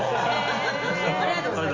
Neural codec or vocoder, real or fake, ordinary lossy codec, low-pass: none; real; Opus, 32 kbps; 7.2 kHz